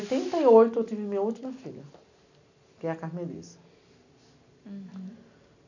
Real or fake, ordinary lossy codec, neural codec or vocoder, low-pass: real; none; none; 7.2 kHz